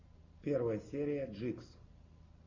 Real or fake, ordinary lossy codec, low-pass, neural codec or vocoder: real; AAC, 32 kbps; 7.2 kHz; none